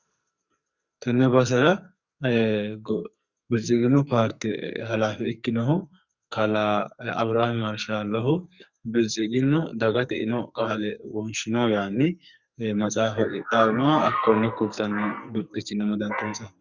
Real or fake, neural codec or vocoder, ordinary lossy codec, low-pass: fake; codec, 44.1 kHz, 2.6 kbps, SNAC; Opus, 64 kbps; 7.2 kHz